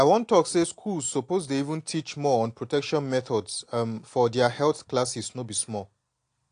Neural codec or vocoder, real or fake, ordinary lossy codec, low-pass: none; real; AAC, 48 kbps; 9.9 kHz